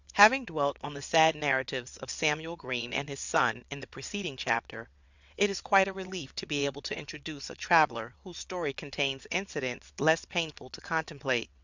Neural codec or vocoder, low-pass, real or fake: vocoder, 22.05 kHz, 80 mel bands, WaveNeXt; 7.2 kHz; fake